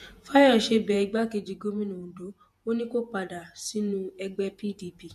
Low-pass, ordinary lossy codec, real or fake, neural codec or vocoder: 14.4 kHz; MP3, 64 kbps; fake; vocoder, 48 kHz, 128 mel bands, Vocos